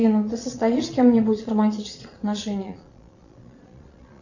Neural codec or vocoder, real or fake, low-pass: vocoder, 22.05 kHz, 80 mel bands, Vocos; fake; 7.2 kHz